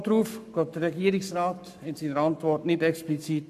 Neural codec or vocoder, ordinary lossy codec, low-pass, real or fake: codec, 44.1 kHz, 7.8 kbps, Pupu-Codec; none; 14.4 kHz; fake